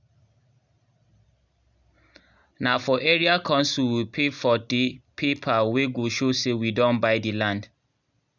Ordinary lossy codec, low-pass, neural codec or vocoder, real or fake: none; 7.2 kHz; none; real